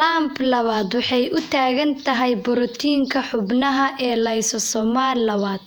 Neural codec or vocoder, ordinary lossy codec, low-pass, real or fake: vocoder, 48 kHz, 128 mel bands, Vocos; none; 19.8 kHz; fake